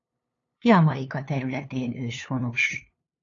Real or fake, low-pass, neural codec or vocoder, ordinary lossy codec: fake; 7.2 kHz; codec, 16 kHz, 2 kbps, FunCodec, trained on LibriTTS, 25 frames a second; AAC, 32 kbps